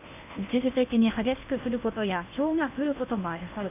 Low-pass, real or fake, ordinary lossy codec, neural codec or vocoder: 3.6 kHz; fake; none; codec, 16 kHz in and 24 kHz out, 0.8 kbps, FocalCodec, streaming, 65536 codes